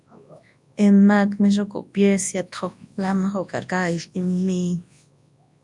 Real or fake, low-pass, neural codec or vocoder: fake; 10.8 kHz; codec, 24 kHz, 0.9 kbps, WavTokenizer, large speech release